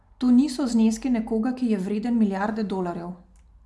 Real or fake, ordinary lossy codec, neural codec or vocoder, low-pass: real; none; none; none